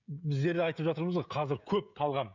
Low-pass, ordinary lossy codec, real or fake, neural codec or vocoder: 7.2 kHz; none; fake; codec, 16 kHz, 16 kbps, FreqCodec, smaller model